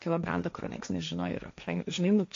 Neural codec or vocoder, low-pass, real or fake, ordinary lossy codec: codec, 16 kHz, 1.1 kbps, Voila-Tokenizer; 7.2 kHz; fake; AAC, 48 kbps